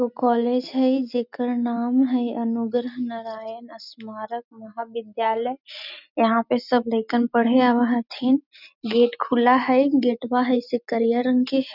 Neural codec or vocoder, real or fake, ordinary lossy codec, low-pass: none; real; none; 5.4 kHz